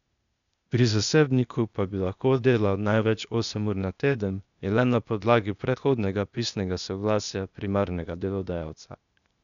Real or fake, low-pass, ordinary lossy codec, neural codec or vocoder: fake; 7.2 kHz; none; codec, 16 kHz, 0.8 kbps, ZipCodec